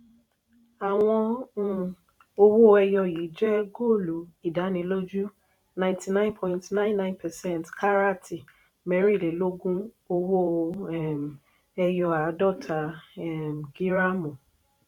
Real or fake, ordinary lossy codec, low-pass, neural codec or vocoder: fake; none; 19.8 kHz; vocoder, 44.1 kHz, 128 mel bands every 512 samples, BigVGAN v2